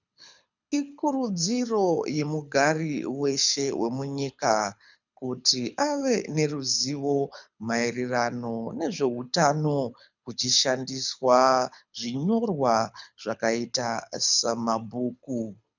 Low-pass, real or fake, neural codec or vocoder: 7.2 kHz; fake; codec, 24 kHz, 6 kbps, HILCodec